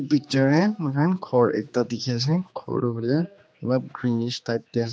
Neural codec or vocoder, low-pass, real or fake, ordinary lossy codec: codec, 16 kHz, 2 kbps, X-Codec, HuBERT features, trained on general audio; none; fake; none